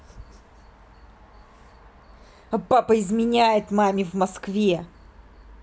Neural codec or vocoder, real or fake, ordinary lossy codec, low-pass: none; real; none; none